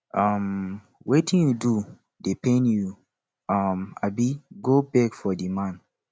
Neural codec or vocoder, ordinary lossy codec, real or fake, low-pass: none; none; real; none